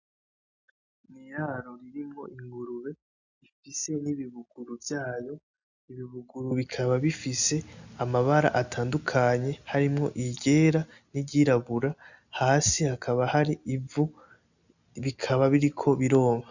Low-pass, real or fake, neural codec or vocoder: 7.2 kHz; real; none